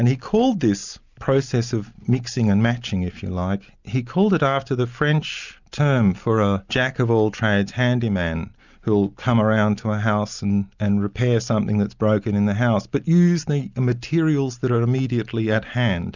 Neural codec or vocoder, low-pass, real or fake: none; 7.2 kHz; real